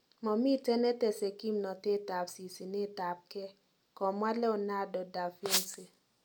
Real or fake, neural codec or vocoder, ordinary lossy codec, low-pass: real; none; none; 19.8 kHz